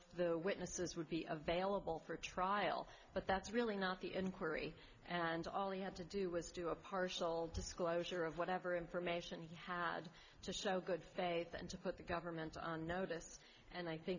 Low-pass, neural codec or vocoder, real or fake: 7.2 kHz; none; real